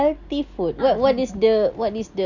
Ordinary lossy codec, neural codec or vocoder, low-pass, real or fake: none; none; 7.2 kHz; real